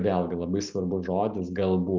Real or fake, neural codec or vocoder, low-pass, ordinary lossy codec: real; none; 7.2 kHz; Opus, 32 kbps